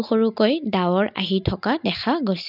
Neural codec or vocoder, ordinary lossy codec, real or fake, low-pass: none; none; real; 5.4 kHz